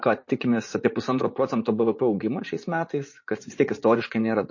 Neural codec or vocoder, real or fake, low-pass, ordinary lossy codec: vocoder, 44.1 kHz, 80 mel bands, Vocos; fake; 7.2 kHz; MP3, 32 kbps